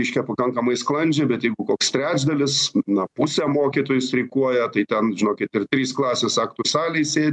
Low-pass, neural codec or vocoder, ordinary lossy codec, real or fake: 10.8 kHz; none; MP3, 96 kbps; real